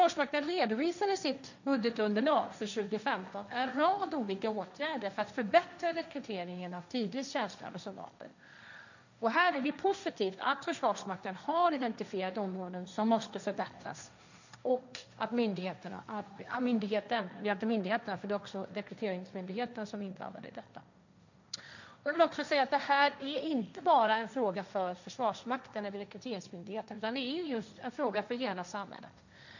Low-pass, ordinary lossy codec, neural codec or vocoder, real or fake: 7.2 kHz; none; codec, 16 kHz, 1.1 kbps, Voila-Tokenizer; fake